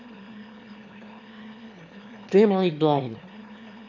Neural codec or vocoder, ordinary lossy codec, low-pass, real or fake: autoencoder, 22.05 kHz, a latent of 192 numbers a frame, VITS, trained on one speaker; MP3, 48 kbps; 7.2 kHz; fake